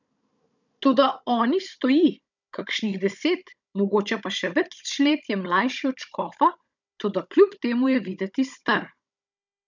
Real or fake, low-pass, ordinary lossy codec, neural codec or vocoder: fake; 7.2 kHz; none; codec, 16 kHz, 16 kbps, FunCodec, trained on Chinese and English, 50 frames a second